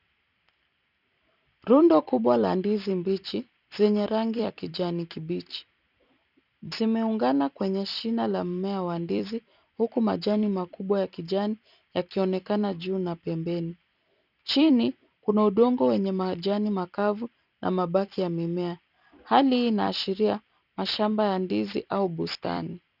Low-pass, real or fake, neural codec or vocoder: 5.4 kHz; fake; vocoder, 44.1 kHz, 128 mel bands every 256 samples, BigVGAN v2